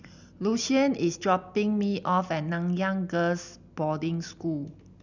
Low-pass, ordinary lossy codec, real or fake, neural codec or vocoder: 7.2 kHz; none; real; none